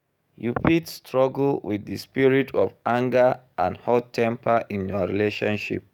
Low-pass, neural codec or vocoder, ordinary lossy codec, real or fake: 19.8 kHz; codec, 44.1 kHz, 7.8 kbps, DAC; none; fake